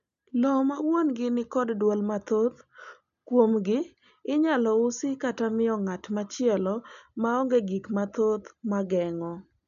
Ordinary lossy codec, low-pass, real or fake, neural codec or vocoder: none; 7.2 kHz; real; none